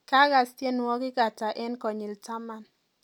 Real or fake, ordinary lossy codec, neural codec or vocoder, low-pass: real; none; none; 19.8 kHz